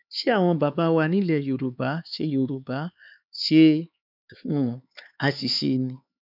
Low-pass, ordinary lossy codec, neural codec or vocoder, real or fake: 5.4 kHz; none; codec, 16 kHz, 4 kbps, X-Codec, HuBERT features, trained on LibriSpeech; fake